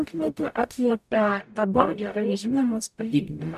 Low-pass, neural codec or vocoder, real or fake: 14.4 kHz; codec, 44.1 kHz, 0.9 kbps, DAC; fake